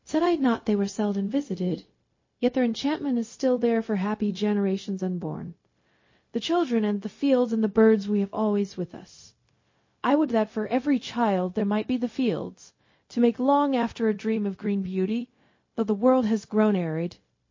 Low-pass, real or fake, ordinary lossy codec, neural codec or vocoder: 7.2 kHz; fake; MP3, 32 kbps; codec, 16 kHz, 0.4 kbps, LongCat-Audio-Codec